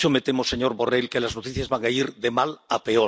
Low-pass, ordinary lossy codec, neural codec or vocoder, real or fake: none; none; none; real